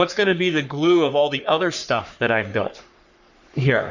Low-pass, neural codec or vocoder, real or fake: 7.2 kHz; codec, 44.1 kHz, 3.4 kbps, Pupu-Codec; fake